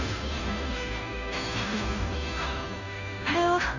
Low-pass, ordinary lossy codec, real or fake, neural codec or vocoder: 7.2 kHz; none; fake; codec, 16 kHz, 0.5 kbps, FunCodec, trained on Chinese and English, 25 frames a second